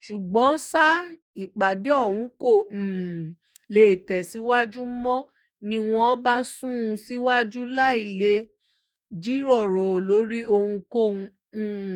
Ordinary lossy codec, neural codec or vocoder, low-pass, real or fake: none; codec, 44.1 kHz, 2.6 kbps, DAC; 19.8 kHz; fake